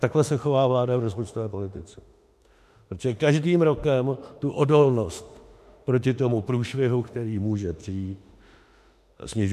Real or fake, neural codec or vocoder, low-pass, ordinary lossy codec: fake; autoencoder, 48 kHz, 32 numbers a frame, DAC-VAE, trained on Japanese speech; 14.4 kHz; MP3, 96 kbps